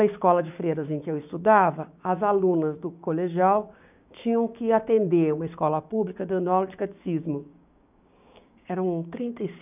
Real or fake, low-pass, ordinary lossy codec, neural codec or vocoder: fake; 3.6 kHz; none; codec, 16 kHz, 6 kbps, DAC